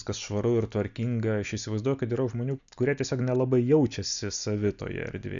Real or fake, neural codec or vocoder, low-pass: real; none; 7.2 kHz